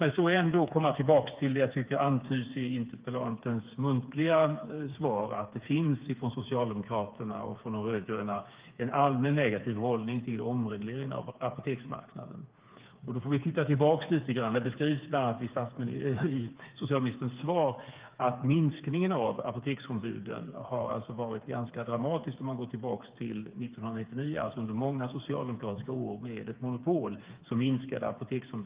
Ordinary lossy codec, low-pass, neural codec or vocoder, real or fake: Opus, 32 kbps; 3.6 kHz; codec, 16 kHz, 4 kbps, FreqCodec, smaller model; fake